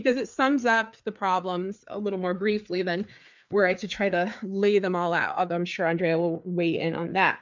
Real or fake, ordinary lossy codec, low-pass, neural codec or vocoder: fake; MP3, 64 kbps; 7.2 kHz; codec, 16 kHz, 4 kbps, FreqCodec, larger model